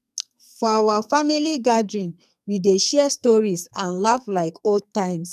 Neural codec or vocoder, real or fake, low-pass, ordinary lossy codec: codec, 44.1 kHz, 2.6 kbps, SNAC; fake; 14.4 kHz; none